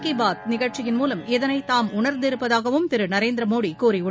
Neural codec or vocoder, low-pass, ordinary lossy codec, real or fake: none; none; none; real